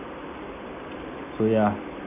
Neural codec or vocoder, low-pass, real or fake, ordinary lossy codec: none; 3.6 kHz; real; none